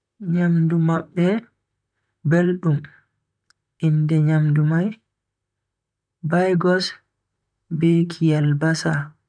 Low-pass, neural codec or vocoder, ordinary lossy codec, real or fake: 9.9 kHz; vocoder, 44.1 kHz, 128 mel bands, Pupu-Vocoder; none; fake